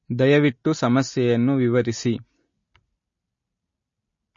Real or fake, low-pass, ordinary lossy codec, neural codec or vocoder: real; 7.2 kHz; MP3, 32 kbps; none